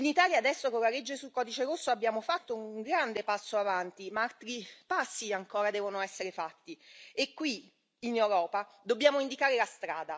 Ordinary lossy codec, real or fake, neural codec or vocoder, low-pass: none; real; none; none